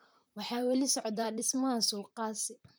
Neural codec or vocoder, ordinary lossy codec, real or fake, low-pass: vocoder, 44.1 kHz, 128 mel bands, Pupu-Vocoder; none; fake; none